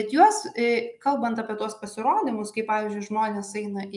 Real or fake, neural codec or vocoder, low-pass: real; none; 10.8 kHz